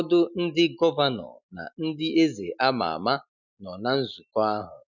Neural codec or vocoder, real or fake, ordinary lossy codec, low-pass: none; real; none; 7.2 kHz